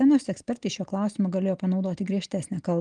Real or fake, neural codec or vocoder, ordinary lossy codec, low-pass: real; none; Opus, 24 kbps; 9.9 kHz